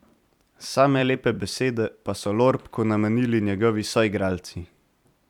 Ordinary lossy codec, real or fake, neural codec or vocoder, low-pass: none; fake; vocoder, 44.1 kHz, 128 mel bands every 256 samples, BigVGAN v2; 19.8 kHz